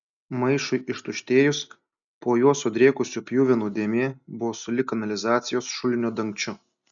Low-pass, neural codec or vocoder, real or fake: 7.2 kHz; none; real